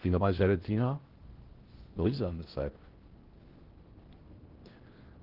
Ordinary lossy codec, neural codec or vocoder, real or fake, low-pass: Opus, 16 kbps; codec, 16 kHz in and 24 kHz out, 0.6 kbps, FocalCodec, streaming, 2048 codes; fake; 5.4 kHz